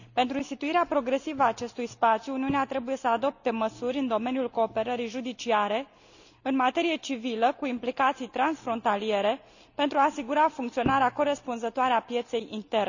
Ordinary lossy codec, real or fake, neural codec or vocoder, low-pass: none; real; none; 7.2 kHz